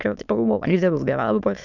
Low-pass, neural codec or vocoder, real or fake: 7.2 kHz; autoencoder, 22.05 kHz, a latent of 192 numbers a frame, VITS, trained on many speakers; fake